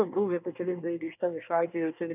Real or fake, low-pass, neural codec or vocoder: fake; 3.6 kHz; codec, 24 kHz, 1 kbps, SNAC